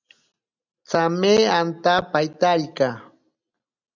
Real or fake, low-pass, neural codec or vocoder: real; 7.2 kHz; none